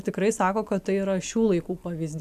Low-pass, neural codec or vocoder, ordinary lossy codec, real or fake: 14.4 kHz; none; MP3, 96 kbps; real